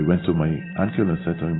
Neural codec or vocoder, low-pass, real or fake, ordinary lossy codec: none; 7.2 kHz; real; AAC, 16 kbps